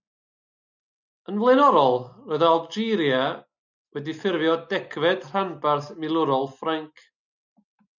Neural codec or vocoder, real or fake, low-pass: none; real; 7.2 kHz